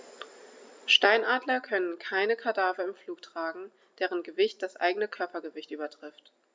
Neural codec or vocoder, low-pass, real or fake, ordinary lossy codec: none; none; real; none